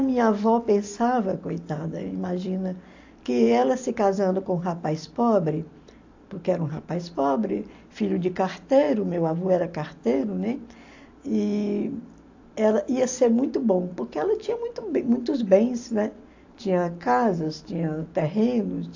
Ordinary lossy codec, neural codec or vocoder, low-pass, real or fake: AAC, 48 kbps; none; 7.2 kHz; real